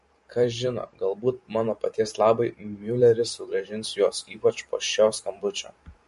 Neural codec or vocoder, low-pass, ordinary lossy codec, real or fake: vocoder, 44.1 kHz, 128 mel bands every 512 samples, BigVGAN v2; 14.4 kHz; MP3, 48 kbps; fake